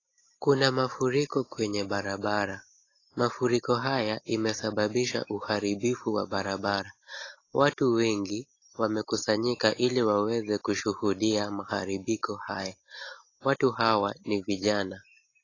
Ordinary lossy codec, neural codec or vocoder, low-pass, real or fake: AAC, 32 kbps; none; 7.2 kHz; real